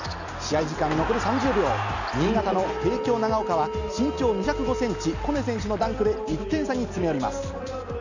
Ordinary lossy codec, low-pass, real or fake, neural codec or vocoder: none; 7.2 kHz; real; none